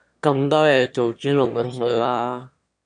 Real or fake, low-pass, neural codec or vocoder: fake; 9.9 kHz; autoencoder, 22.05 kHz, a latent of 192 numbers a frame, VITS, trained on one speaker